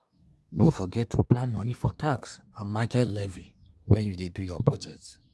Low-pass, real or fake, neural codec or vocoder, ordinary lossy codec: none; fake; codec, 24 kHz, 1 kbps, SNAC; none